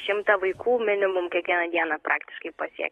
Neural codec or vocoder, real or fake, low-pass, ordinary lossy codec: autoencoder, 48 kHz, 128 numbers a frame, DAC-VAE, trained on Japanese speech; fake; 19.8 kHz; AAC, 32 kbps